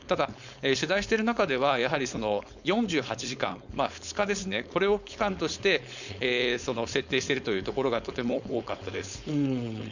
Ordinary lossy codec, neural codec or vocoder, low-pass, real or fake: none; codec, 16 kHz, 4.8 kbps, FACodec; 7.2 kHz; fake